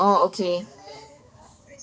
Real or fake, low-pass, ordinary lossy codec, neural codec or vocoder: fake; none; none; codec, 16 kHz, 4 kbps, X-Codec, HuBERT features, trained on balanced general audio